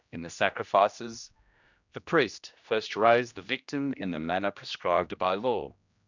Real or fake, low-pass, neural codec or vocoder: fake; 7.2 kHz; codec, 16 kHz, 1 kbps, X-Codec, HuBERT features, trained on general audio